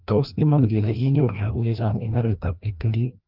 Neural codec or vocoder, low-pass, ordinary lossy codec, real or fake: codec, 16 kHz, 1 kbps, FreqCodec, larger model; 5.4 kHz; Opus, 24 kbps; fake